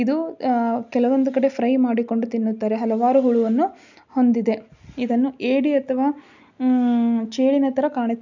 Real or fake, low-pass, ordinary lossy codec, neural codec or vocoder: real; 7.2 kHz; none; none